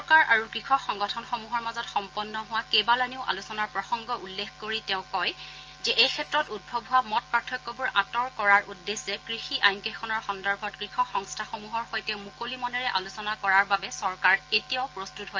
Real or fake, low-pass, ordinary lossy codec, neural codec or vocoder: real; 7.2 kHz; Opus, 24 kbps; none